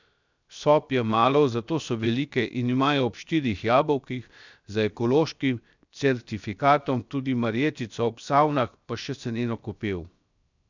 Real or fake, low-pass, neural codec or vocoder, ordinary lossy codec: fake; 7.2 kHz; codec, 16 kHz, 0.7 kbps, FocalCodec; none